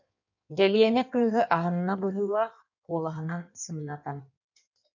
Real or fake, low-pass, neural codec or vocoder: fake; 7.2 kHz; codec, 16 kHz in and 24 kHz out, 1.1 kbps, FireRedTTS-2 codec